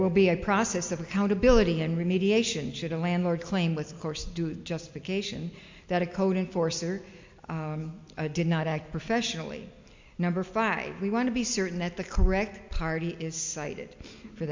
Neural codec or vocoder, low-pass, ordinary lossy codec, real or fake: none; 7.2 kHz; MP3, 64 kbps; real